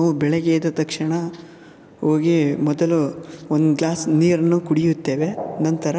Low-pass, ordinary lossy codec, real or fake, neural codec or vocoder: none; none; real; none